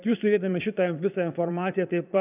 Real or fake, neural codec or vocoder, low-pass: fake; vocoder, 22.05 kHz, 80 mel bands, Vocos; 3.6 kHz